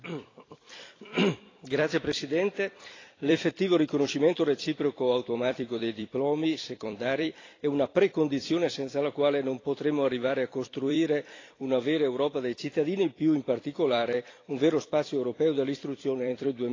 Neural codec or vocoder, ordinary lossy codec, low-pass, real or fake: vocoder, 44.1 kHz, 128 mel bands every 256 samples, BigVGAN v2; AAC, 32 kbps; 7.2 kHz; fake